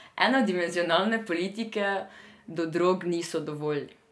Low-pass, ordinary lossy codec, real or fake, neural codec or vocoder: none; none; real; none